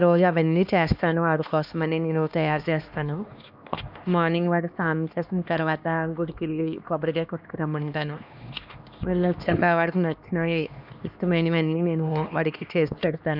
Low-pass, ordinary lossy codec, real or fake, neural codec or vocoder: 5.4 kHz; none; fake; codec, 16 kHz, 2 kbps, X-Codec, HuBERT features, trained on LibriSpeech